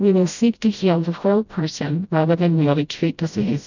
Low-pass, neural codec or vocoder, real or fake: 7.2 kHz; codec, 16 kHz, 0.5 kbps, FreqCodec, smaller model; fake